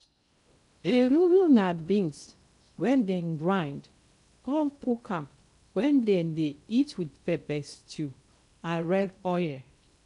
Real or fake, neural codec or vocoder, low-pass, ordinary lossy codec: fake; codec, 16 kHz in and 24 kHz out, 0.8 kbps, FocalCodec, streaming, 65536 codes; 10.8 kHz; none